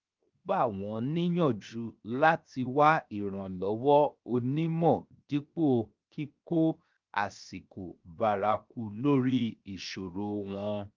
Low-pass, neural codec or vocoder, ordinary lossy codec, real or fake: 7.2 kHz; codec, 16 kHz, 0.7 kbps, FocalCodec; Opus, 24 kbps; fake